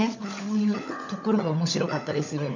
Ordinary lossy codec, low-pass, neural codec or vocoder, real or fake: none; 7.2 kHz; codec, 16 kHz, 16 kbps, FunCodec, trained on LibriTTS, 50 frames a second; fake